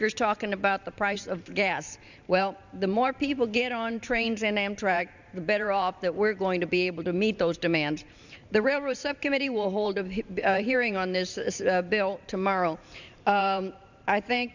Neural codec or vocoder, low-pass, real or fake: vocoder, 44.1 kHz, 128 mel bands every 512 samples, BigVGAN v2; 7.2 kHz; fake